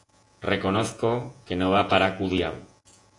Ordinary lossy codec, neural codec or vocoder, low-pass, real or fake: AAC, 48 kbps; vocoder, 48 kHz, 128 mel bands, Vocos; 10.8 kHz; fake